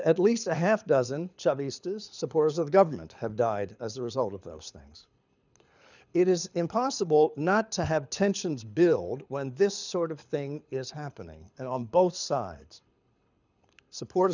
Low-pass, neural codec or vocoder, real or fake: 7.2 kHz; codec, 24 kHz, 6 kbps, HILCodec; fake